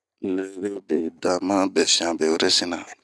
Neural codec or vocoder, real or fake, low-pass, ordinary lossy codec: none; real; none; none